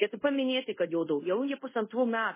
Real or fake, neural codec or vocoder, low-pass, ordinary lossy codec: fake; codec, 24 kHz, 0.5 kbps, DualCodec; 3.6 kHz; MP3, 16 kbps